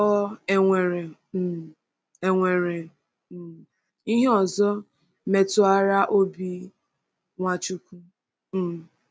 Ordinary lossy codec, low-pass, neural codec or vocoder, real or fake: none; none; none; real